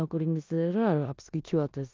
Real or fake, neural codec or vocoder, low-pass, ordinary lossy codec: fake; codec, 16 kHz in and 24 kHz out, 0.9 kbps, LongCat-Audio-Codec, four codebook decoder; 7.2 kHz; Opus, 32 kbps